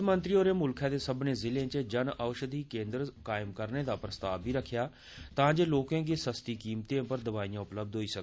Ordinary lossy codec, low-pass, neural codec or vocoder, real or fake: none; none; none; real